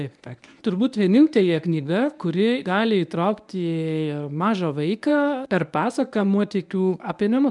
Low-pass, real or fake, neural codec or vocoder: 10.8 kHz; fake; codec, 24 kHz, 0.9 kbps, WavTokenizer, medium speech release version 1